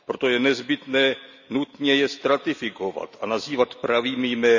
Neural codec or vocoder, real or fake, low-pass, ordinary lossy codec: none; real; 7.2 kHz; none